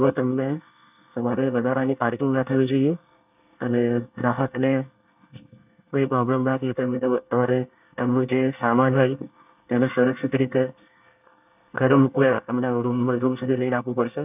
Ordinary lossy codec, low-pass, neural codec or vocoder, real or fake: none; 3.6 kHz; codec, 24 kHz, 1 kbps, SNAC; fake